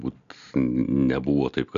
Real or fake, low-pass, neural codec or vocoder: real; 7.2 kHz; none